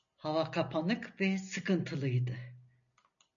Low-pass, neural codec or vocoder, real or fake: 7.2 kHz; none; real